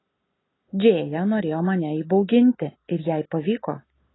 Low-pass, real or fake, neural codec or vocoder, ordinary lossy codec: 7.2 kHz; real; none; AAC, 16 kbps